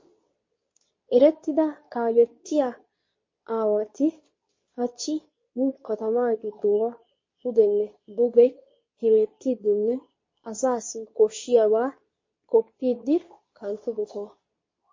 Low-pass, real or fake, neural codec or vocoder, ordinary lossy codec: 7.2 kHz; fake; codec, 24 kHz, 0.9 kbps, WavTokenizer, medium speech release version 2; MP3, 32 kbps